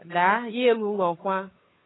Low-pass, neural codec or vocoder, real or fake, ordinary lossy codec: 7.2 kHz; codec, 16 kHz, 2 kbps, FunCodec, trained on Chinese and English, 25 frames a second; fake; AAC, 16 kbps